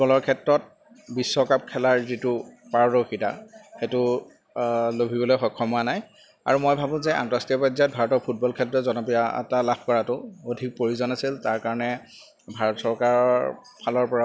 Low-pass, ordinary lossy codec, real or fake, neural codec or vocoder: none; none; real; none